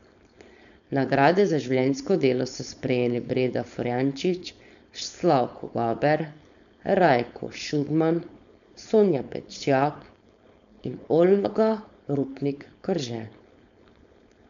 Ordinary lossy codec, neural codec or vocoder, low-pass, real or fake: none; codec, 16 kHz, 4.8 kbps, FACodec; 7.2 kHz; fake